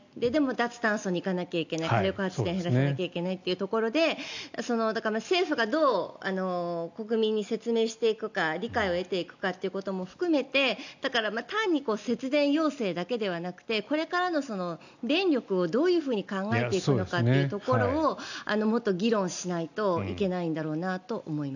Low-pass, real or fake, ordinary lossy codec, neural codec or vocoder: 7.2 kHz; real; none; none